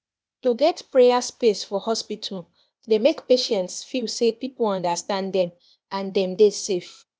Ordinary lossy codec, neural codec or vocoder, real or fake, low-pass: none; codec, 16 kHz, 0.8 kbps, ZipCodec; fake; none